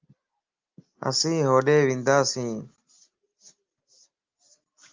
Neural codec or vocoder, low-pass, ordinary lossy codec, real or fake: none; 7.2 kHz; Opus, 32 kbps; real